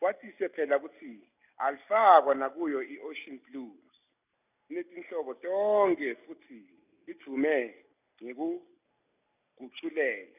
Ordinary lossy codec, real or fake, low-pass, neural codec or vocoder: none; real; 3.6 kHz; none